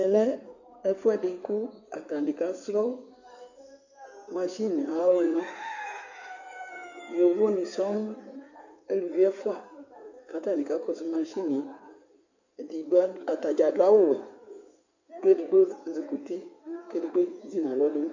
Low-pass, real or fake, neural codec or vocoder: 7.2 kHz; fake; codec, 16 kHz in and 24 kHz out, 2.2 kbps, FireRedTTS-2 codec